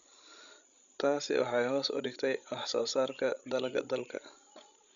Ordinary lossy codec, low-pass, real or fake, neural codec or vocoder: none; 7.2 kHz; fake; codec, 16 kHz, 16 kbps, FreqCodec, larger model